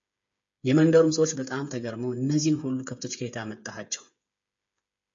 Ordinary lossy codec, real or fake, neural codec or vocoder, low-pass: MP3, 48 kbps; fake; codec, 16 kHz, 8 kbps, FreqCodec, smaller model; 7.2 kHz